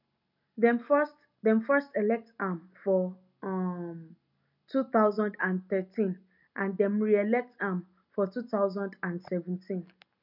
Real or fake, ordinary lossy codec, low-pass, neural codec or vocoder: real; none; 5.4 kHz; none